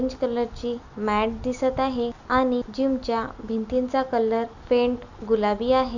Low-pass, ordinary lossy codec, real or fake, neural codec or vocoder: 7.2 kHz; none; real; none